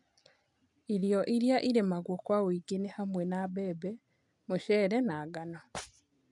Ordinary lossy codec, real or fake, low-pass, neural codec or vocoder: none; real; 10.8 kHz; none